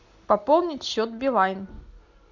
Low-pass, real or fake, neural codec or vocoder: 7.2 kHz; real; none